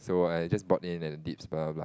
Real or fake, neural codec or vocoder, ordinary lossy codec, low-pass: real; none; none; none